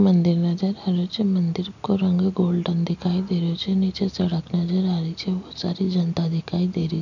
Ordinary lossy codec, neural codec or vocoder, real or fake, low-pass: none; none; real; 7.2 kHz